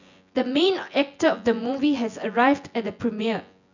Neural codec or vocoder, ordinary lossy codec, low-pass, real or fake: vocoder, 24 kHz, 100 mel bands, Vocos; none; 7.2 kHz; fake